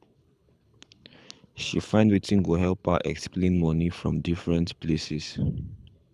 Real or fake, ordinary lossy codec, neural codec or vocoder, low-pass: fake; none; codec, 24 kHz, 6 kbps, HILCodec; none